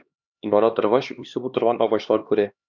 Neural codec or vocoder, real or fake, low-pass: codec, 16 kHz, 2 kbps, X-Codec, HuBERT features, trained on LibriSpeech; fake; 7.2 kHz